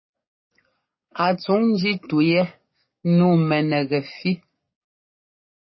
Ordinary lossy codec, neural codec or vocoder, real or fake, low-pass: MP3, 24 kbps; codec, 44.1 kHz, 7.8 kbps, DAC; fake; 7.2 kHz